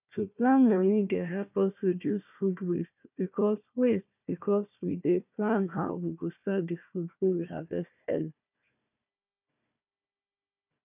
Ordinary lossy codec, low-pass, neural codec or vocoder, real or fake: none; 3.6 kHz; codec, 16 kHz, 1 kbps, FunCodec, trained on Chinese and English, 50 frames a second; fake